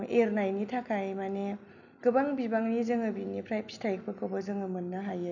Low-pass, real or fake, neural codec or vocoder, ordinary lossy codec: 7.2 kHz; real; none; none